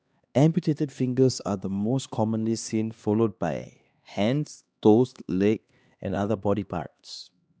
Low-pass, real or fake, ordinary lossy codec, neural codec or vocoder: none; fake; none; codec, 16 kHz, 2 kbps, X-Codec, HuBERT features, trained on LibriSpeech